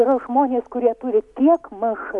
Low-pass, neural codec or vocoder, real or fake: 10.8 kHz; none; real